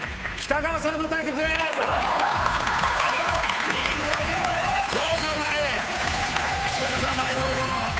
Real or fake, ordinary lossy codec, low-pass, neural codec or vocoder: fake; none; none; codec, 16 kHz, 2 kbps, FunCodec, trained on Chinese and English, 25 frames a second